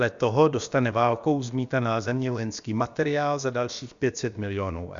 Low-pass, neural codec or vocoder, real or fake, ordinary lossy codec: 7.2 kHz; codec, 16 kHz, about 1 kbps, DyCAST, with the encoder's durations; fake; Opus, 64 kbps